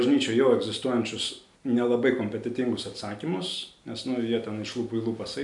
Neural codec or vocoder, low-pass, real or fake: none; 10.8 kHz; real